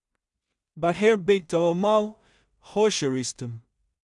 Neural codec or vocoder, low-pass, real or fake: codec, 16 kHz in and 24 kHz out, 0.4 kbps, LongCat-Audio-Codec, two codebook decoder; 10.8 kHz; fake